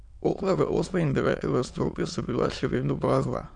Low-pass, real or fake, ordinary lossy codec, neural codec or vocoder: 9.9 kHz; fake; none; autoencoder, 22.05 kHz, a latent of 192 numbers a frame, VITS, trained on many speakers